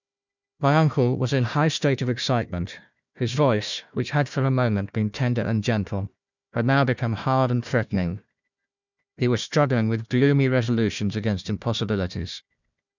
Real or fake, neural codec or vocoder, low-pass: fake; codec, 16 kHz, 1 kbps, FunCodec, trained on Chinese and English, 50 frames a second; 7.2 kHz